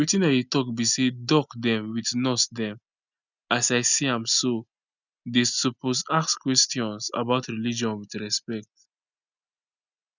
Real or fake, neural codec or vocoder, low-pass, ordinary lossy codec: real; none; 7.2 kHz; none